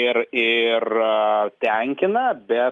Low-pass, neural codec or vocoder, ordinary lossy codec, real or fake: 10.8 kHz; none; Opus, 32 kbps; real